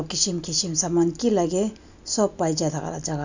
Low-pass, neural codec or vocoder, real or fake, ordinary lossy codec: 7.2 kHz; none; real; none